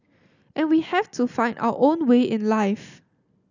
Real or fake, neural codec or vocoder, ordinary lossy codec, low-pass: real; none; none; 7.2 kHz